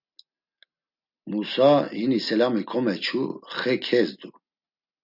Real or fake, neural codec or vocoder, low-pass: real; none; 5.4 kHz